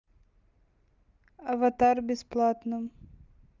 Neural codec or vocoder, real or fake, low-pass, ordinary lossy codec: none; real; 7.2 kHz; Opus, 32 kbps